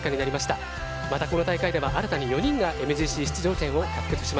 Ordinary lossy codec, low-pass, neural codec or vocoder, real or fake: none; none; none; real